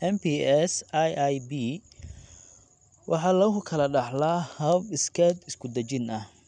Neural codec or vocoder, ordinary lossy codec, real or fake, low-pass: none; none; real; 9.9 kHz